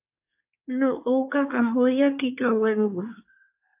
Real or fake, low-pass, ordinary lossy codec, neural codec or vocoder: fake; 3.6 kHz; AAC, 32 kbps; codec, 24 kHz, 1 kbps, SNAC